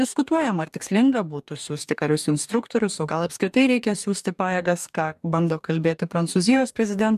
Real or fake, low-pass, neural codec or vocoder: fake; 14.4 kHz; codec, 44.1 kHz, 2.6 kbps, DAC